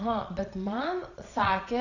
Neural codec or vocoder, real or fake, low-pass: vocoder, 22.05 kHz, 80 mel bands, Vocos; fake; 7.2 kHz